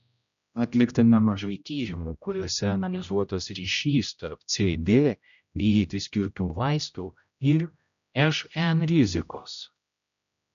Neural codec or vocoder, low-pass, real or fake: codec, 16 kHz, 0.5 kbps, X-Codec, HuBERT features, trained on general audio; 7.2 kHz; fake